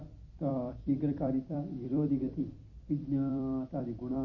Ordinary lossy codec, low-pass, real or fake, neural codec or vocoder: MP3, 32 kbps; 7.2 kHz; fake; vocoder, 44.1 kHz, 128 mel bands every 256 samples, BigVGAN v2